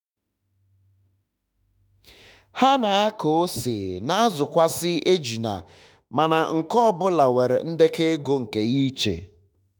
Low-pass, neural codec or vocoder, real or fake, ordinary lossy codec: none; autoencoder, 48 kHz, 32 numbers a frame, DAC-VAE, trained on Japanese speech; fake; none